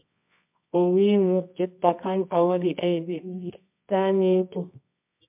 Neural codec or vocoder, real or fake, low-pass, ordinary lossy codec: codec, 24 kHz, 0.9 kbps, WavTokenizer, medium music audio release; fake; 3.6 kHz; MP3, 32 kbps